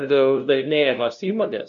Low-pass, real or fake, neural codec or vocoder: 7.2 kHz; fake; codec, 16 kHz, 0.5 kbps, FunCodec, trained on LibriTTS, 25 frames a second